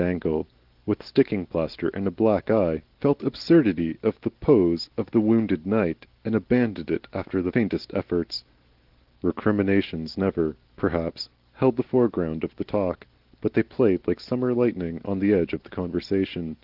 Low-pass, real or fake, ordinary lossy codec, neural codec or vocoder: 5.4 kHz; real; Opus, 16 kbps; none